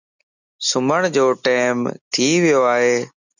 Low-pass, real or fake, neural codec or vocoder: 7.2 kHz; real; none